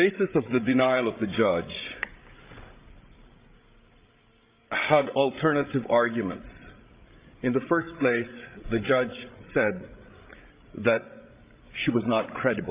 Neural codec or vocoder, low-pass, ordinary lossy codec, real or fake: codec, 16 kHz, 16 kbps, FreqCodec, larger model; 3.6 kHz; Opus, 24 kbps; fake